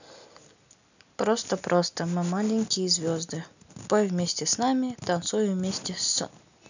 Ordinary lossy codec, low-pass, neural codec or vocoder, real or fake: none; 7.2 kHz; none; real